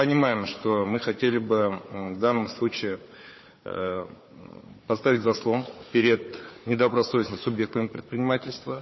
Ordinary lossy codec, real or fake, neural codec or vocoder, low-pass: MP3, 24 kbps; fake; codec, 16 kHz, 4 kbps, FunCodec, trained on Chinese and English, 50 frames a second; 7.2 kHz